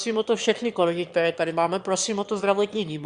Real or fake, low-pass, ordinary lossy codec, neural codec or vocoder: fake; 9.9 kHz; Opus, 64 kbps; autoencoder, 22.05 kHz, a latent of 192 numbers a frame, VITS, trained on one speaker